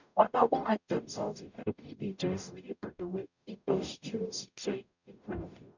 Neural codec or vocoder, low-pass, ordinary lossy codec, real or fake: codec, 44.1 kHz, 0.9 kbps, DAC; 7.2 kHz; none; fake